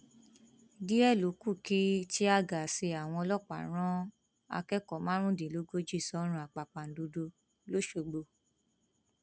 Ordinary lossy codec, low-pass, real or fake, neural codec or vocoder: none; none; real; none